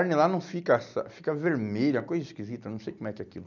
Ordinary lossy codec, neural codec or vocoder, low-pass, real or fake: none; none; 7.2 kHz; real